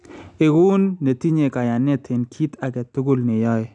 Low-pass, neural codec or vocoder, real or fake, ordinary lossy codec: 10.8 kHz; none; real; none